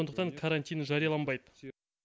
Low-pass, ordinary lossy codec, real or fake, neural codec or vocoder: none; none; real; none